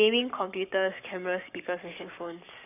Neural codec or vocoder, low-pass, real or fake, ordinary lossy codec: codec, 44.1 kHz, 7.8 kbps, Pupu-Codec; 3.6 kHz; fake; none